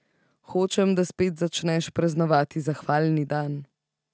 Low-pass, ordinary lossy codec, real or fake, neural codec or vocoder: none; none; real; none